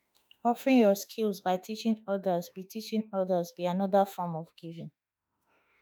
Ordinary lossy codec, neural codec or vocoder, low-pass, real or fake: none; autoencoder, 48 kHz, 32 numbers a frame, DAC-VAE, trained on Japanese speech; none; fake